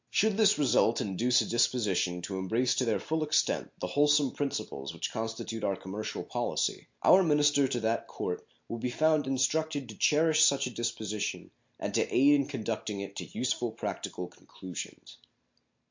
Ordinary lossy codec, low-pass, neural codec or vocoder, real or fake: MP3, 48 kbps; 7.2 kHz; none; real